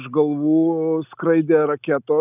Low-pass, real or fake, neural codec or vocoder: 3.6 kHz; real; none